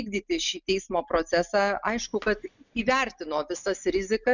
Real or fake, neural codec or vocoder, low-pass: real; none; 7.2 kHz